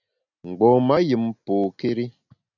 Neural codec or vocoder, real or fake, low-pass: none; real; 7.2 kHz